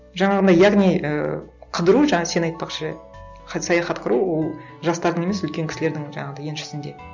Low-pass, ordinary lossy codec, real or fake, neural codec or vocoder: 7.2 kHz; none; real; none